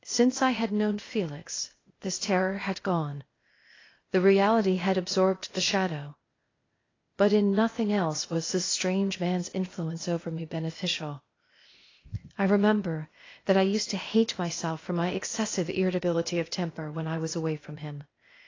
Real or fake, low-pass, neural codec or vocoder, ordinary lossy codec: fake; 7.2 kHz; codec, 16 kHz, 0.8 kbps, ZipCodec; AAC, 32 kbps